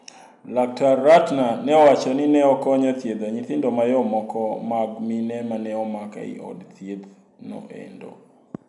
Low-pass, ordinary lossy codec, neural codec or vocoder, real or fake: 10.8 kHz; none; none; real